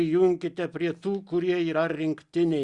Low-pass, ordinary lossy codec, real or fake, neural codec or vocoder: 10.8 kHz; Opus, 64 kbps; real; none